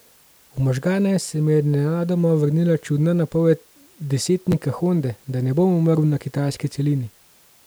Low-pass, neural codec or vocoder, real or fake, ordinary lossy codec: none; none; real; none